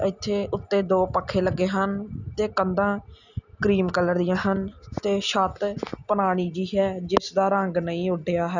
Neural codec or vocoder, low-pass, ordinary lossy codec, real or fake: none; 7.2 kHz; none; real